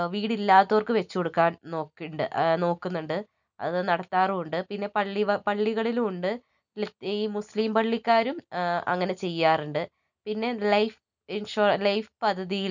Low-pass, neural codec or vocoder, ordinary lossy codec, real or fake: 7.2 kHz; none; none; real